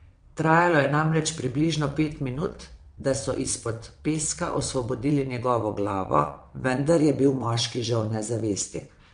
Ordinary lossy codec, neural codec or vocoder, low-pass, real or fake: MP3, 64 kbps; vocoder, 22.05 kHz, 80 mel bands, WaveNeXt; 9.9 kHz; fake